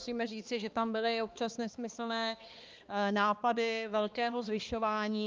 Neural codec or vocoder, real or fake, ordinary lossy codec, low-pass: codec, 16 kHz, 2 kbps, X-Codec, HuBERT features, trained on balanced general audio; fake; Opus, 24 kbps; 7.2 kHz